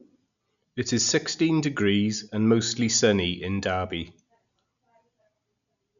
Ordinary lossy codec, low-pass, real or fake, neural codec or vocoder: MP3, 96 kbps; 7.2 kHz; real; none